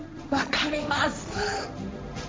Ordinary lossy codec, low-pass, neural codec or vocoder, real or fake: none; none; codec, 16 kHz, 1.1 kbps, Voila-Tokenizer; fake